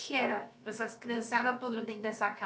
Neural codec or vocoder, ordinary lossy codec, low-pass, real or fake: codec, 16 kHz, about 1 kbps, DyCAST, with the encoder's durations; none; none; fake